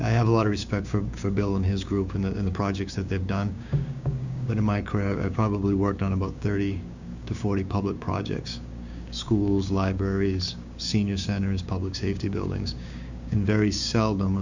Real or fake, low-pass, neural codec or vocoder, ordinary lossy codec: fake; 7.2 kHz; codec, 16 kHz, 6 kbps, DAC; Opus, 64 kbps